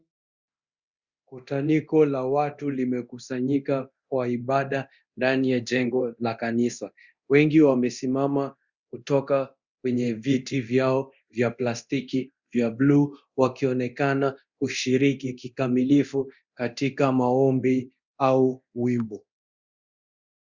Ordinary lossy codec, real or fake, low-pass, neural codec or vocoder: Opus, 64 kbps; fake; 7.2 kHz; codec, 24 kHz, 0.9 kbps, DualCodec